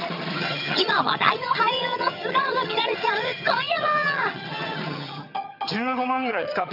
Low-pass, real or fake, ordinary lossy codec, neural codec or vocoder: 5.4 kHz; fake; none; vocoder, 22.05 kHz, 80 mel bands, HiFi-GAN